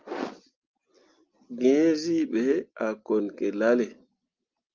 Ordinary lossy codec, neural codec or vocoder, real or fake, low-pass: Opus, 32 kbps; none; real; 7.2 kHz